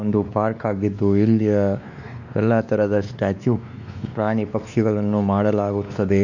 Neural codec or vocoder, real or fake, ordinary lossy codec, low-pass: codec, 16 kHz, 2 kbps, X-Codec, WavLM features, trained on Multilingual LibriSpeech; fake; none; 7.2 kHz